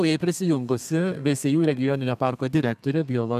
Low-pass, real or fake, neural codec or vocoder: 14.4 kHz; fake; codec, 32 kHz, 1.9 kbps, SNAC